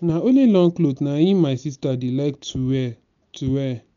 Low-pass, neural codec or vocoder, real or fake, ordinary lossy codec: 7.2 kHz; none; real; none